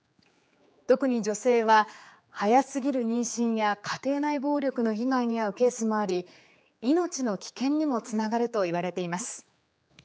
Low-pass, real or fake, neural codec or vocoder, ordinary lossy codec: none; fake; codec, 16 kHz, 4 kbps, X-Codec, HuBERT features, trained on general audio; none